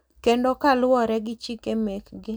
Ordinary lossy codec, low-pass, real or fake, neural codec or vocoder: none; none; real; none